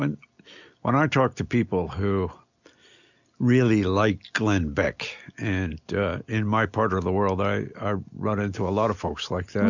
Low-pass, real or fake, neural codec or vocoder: 7.2 kHz; real; none